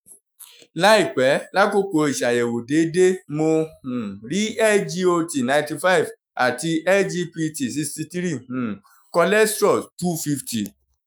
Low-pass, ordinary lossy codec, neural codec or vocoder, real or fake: none; none; autoencoder, 48 kHz, 128 numbers a frame, DAC-VAE, trained on Japanese speech; fake